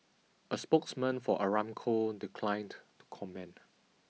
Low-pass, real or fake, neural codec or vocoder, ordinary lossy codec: none; real; none; none